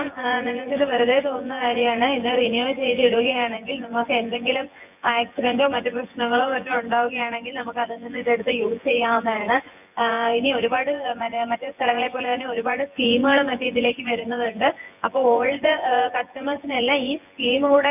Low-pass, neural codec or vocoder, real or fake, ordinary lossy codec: 3.6 kHz; vocoder, 24 kHz, 100 mel bands, Vocos; fake; none